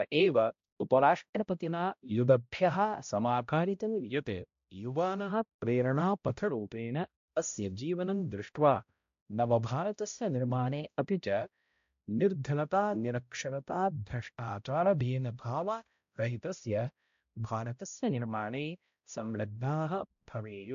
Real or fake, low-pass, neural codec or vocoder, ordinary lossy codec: fake; 7.2 kHz; codec, 16 kHz, 0.5 kbps, X-Codec, HuBERT features, trained on balanced general audio; AAC, 64 kbps